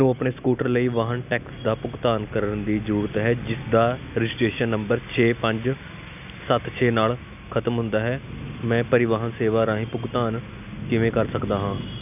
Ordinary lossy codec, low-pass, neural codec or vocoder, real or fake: none; 3.6 kHz; none; real